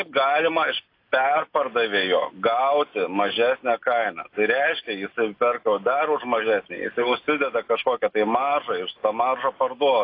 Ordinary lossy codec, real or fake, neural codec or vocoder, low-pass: AAC, 32 kbps; real; none; 5.4 kHz